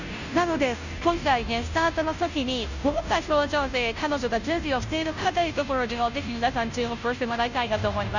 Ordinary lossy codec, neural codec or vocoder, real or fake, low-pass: none; codec, 16 kHz, 0.5 kbps, FunCodec, trained on Chinese and English, 25 frames a second; fake; 7.2 kHz